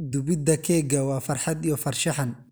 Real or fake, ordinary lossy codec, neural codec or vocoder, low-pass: real; none; none; none